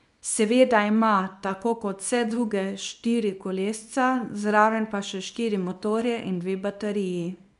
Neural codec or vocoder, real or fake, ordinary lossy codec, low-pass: codec, 24 kHz, 0.9 kbps, WavTokenizer, small release; fake; none; 10.8 kHz